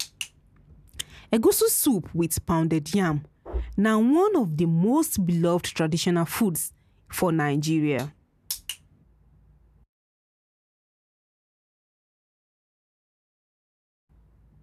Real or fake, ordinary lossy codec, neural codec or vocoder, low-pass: real; none; none; 14.4 kHz